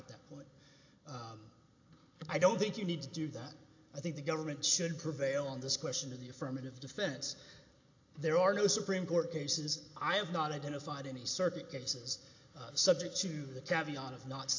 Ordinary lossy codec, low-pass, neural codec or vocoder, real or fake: AAC, 48 kbps; 7.2 kHz; none; real